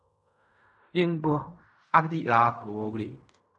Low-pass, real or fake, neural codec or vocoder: 10.8 kHz; fake; codec, 16 kHz in and 24 kHz out, 0.4 kbps, LongCat-Audio-Codec, fine tuned four codebook decoder